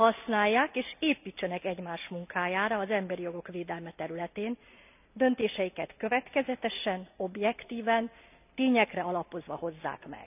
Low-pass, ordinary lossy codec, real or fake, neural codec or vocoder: 3.6 kHz; none; real; none